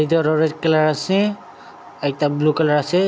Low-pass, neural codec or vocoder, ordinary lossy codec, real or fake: none; none; none; real